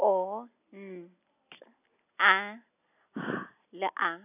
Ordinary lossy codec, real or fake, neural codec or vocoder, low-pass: none; real; none; 3.6 kHz